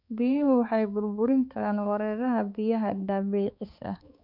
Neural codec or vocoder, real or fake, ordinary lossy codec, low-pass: codec, 16 kHz, 4 kbps, X-Codec, HuBERT features, trained on balanced general audio; fake; none; 5.4 kHz